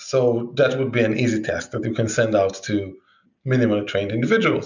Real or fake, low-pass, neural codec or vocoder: real; 7.2 kHz; none